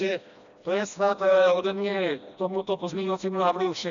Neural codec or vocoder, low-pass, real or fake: codec, 16 kHz, 1 kbps, FreqCodec, smaller model; 7.2 kHz; fake